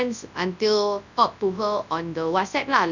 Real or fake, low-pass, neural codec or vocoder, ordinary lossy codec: fake; 7.2 kHz; codec, 24 kHz, 0.9 kbps, WavTokenizer, large speech release; none